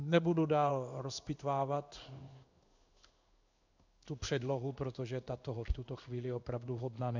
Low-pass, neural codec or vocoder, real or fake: 7.2 kHz; codec, 16 kHz in and 24 kHz out, 1 kbps, XY-Tokenizer; fake